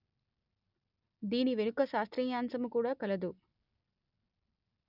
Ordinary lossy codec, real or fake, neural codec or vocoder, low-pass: none; real; none; 5.4 kHz